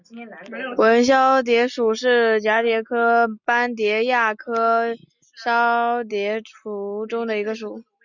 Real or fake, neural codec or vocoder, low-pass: real; none; 7.2 kHz